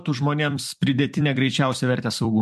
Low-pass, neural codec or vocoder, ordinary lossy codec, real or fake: 14.4 kHz; vocoder, 44.1 kHz, 128 mel bands every 256 samples, BigVGAN v2; MP3, 64 kbps; fake